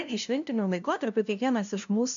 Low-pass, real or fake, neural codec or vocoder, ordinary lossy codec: 7.2 kHz; fake; codec, 16 kHz, 0.5 kbps, FunCodec, trained on LibriTTS, 25 frames a second; MP3, 64 kbps